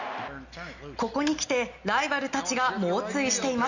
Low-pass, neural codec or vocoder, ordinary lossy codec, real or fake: 7.2 kHz; none; none; real